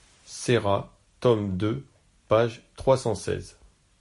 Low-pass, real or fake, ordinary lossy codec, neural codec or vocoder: 14.4 kHz; real; MP3, 48 kbps; none